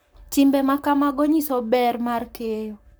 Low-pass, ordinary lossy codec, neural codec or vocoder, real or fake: none; none; codec, 44.1 kHz, 7.8 kbps, Pupu-Codec; fake